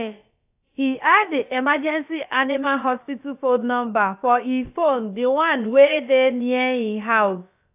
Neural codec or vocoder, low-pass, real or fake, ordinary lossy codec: codec, 16 kHz, about 1 kbps, DyCAST, with the encoder's durations; 3.6 kHz; fake; AAC, 32 kbps